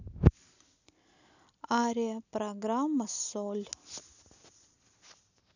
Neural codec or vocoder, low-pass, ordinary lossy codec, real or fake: none; 7.2 kHz; none; real